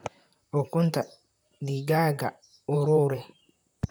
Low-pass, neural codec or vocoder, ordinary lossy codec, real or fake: none; vocoder, 44.1 kHz, 128 mel bands, Pupu-Vocoder; none; fake